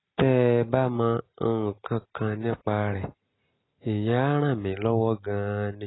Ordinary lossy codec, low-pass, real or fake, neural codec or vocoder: AAC, 16 kbps; 7.2 kHz; real; none